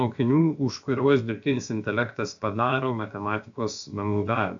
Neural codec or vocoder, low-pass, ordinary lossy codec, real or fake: codec, 16 kHz, about 1 kbps, DyCAST, with the encoder's durations; 7.2 kHz; AAC, 64 kbps; fake